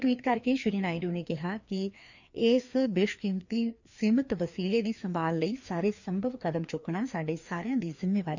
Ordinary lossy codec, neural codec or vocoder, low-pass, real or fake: none; codec, 16 kHz, 2 kbps, FreqCodec, larger model; 7.2 kHz; fake